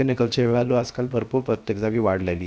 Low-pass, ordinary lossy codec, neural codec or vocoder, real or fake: none; none; codec, 16 kHz, 0.7 kbps, FocalCodec; fake